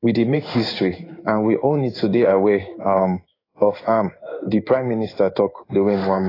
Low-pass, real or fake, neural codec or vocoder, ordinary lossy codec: 5.4 kHz; fake; codec, 16 kHz in and 24 kHz out, 1 kbps, XY-Tokenizer; AAC, 24 kbps